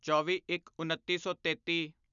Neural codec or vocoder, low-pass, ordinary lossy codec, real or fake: none; 7.2 kHz; none; real